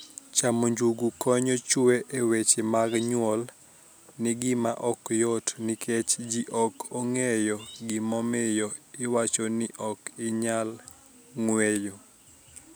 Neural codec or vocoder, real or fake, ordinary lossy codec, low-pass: none; real; none; none